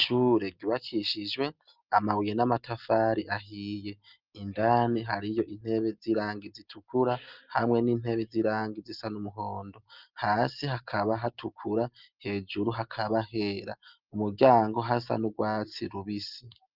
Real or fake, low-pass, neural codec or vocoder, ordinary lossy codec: real; 5.4 kHz; none; Opus, 24 kbps